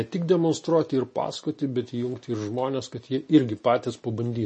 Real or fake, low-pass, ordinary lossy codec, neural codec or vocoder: real; 10.8 kHz; MP3, 32 kbps; none